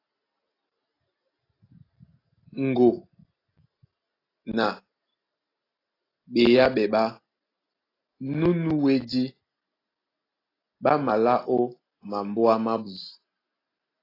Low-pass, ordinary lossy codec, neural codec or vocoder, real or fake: 5.4 kHz; AAC, 24 kbps; none; real